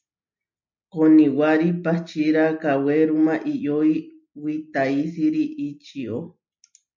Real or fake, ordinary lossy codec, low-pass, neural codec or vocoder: real; MP3, 64 kbps; 7.2 kHz; none